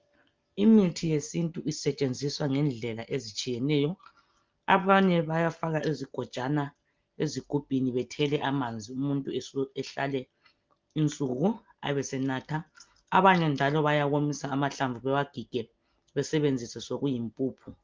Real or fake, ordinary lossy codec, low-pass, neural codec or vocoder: real; Opus, 32 kbps; 7.2 kHz; none